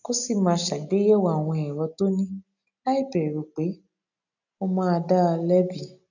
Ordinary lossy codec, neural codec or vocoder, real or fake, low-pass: none; none; real; 7.2 kHz